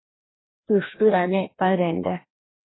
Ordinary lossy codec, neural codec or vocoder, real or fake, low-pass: AAC, 16 kbps; codec, 16 kHz, 1 kbps, FreqCodec, larger model; fake; 7.2 kHz